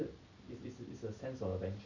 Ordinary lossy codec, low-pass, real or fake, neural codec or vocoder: none; 7.2 kHz; real; none